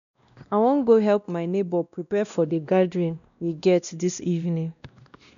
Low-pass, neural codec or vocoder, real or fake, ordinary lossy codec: 7.2 kHz; codec, 16 kHz, 1 kbps, X-Codec, WavLM features, trained on Multilingual LibriSpeech; fake; MP3, 96 kbps